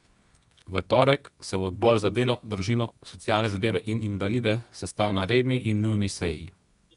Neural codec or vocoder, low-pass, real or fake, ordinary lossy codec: codec, 24 kHz, 0.9 kbps, WavTokenizer, medium music audio release; 10.8 kHz; fake; none